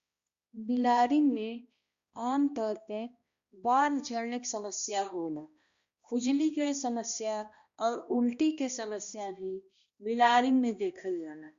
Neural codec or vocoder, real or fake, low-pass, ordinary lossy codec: codec, 16 kHz, 1 kbps, X-Codec, HuBERT features, trained on balanced general audio; fake; 7.2 kHz; Opus, 64 kbps